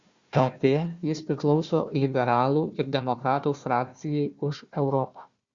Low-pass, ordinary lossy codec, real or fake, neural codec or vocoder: 7.2 kHz; Opus, 64 kbps; fake; codec, 16 kHz, 1 kbps, FunCodec, trained on Chinese and English, 50 frames a second